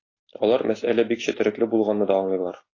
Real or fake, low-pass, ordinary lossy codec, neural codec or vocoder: real; 7.2 kHz; AAC, 32 kbps; none